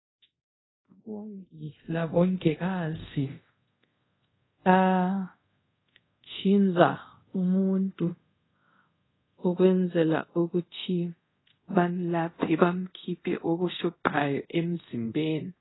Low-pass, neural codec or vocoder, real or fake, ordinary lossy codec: 7.2 kHz; codec, 24 kHz, 0.5 kbps, DualCodec; fake; AAC, 16 kbps